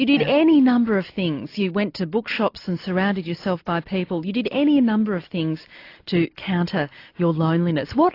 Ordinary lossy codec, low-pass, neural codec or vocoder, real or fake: AAC, 32 kbps; 5.4 kHz; none; real